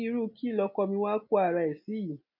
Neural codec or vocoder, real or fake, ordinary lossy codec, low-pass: none; real; none; 5.4 kHz